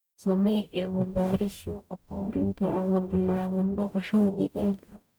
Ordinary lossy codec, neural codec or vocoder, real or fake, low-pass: none; codec, 44.1 kHz, 0.9 kbps, DAC; fake; none